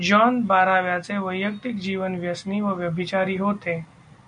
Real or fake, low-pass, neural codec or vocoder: real; 9.9 kHz; none